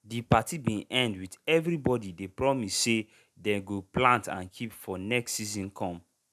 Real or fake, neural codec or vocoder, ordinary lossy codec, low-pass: real; none; none; 14.4 kHz